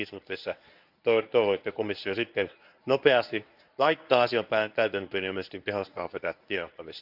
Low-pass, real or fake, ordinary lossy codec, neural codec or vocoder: 5.4 kHz; fake; none; codec, 24 kHz, 0.9 kbps, WavTokenizer, medium speech release version 1